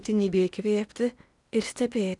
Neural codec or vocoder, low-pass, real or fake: codec, 16 kHz in and 24 kHz out, 0.8 kbps, FocalCodec, streaming, 65536 codes; 10.8 kHz; fake